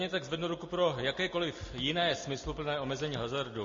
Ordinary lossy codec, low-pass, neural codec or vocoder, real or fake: MP3, 32 kbps; 7.2 kHz; none; real